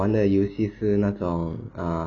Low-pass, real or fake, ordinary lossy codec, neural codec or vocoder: 7.2 kHz; real; none; none